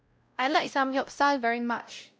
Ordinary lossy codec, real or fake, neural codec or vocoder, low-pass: none; fake; codec, 16 kHz, 0.5 kbps, X-Codec, WavLM features, trained on Multilingual LibriSpeech; none